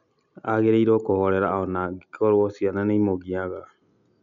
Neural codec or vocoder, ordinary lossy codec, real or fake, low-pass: none; none; real; 7.2 kHz